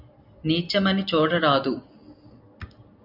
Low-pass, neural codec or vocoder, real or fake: 5.4 kHz; none; real